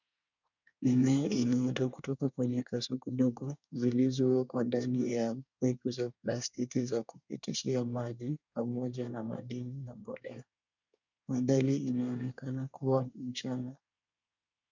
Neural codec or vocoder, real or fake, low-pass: codec, 24 kHz, 1 kbps, SNAC; fake; 7.2 kHz